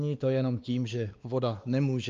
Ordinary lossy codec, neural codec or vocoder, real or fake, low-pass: Opus, 24 kbps; codec, 16 kHz, 2 kbps, X-Codec, WavLM features, trained on Multilingual LibriSpeech; fake; 7.2 kHz